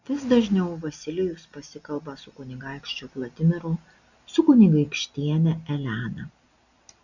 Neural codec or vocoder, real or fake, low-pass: none; real; 7.2 kHz